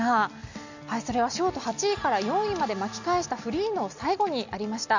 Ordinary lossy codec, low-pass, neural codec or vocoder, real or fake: none; 7.2 kHz; none; real